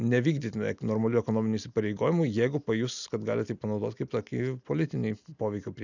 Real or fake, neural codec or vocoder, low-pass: real; none; 7.2 kHz